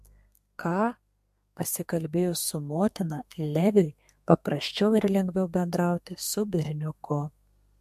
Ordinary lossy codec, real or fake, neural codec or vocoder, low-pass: MP3, 64 kbps; fake; codec, 32 kHz, 1.9 kbps, SNAC; 14.4 kHz